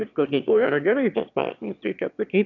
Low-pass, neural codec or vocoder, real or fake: 7.2 kHz; autoencoder, 22.05 kHz, a latent of 192 numbers a frame, VITS, trained on one speaker; fake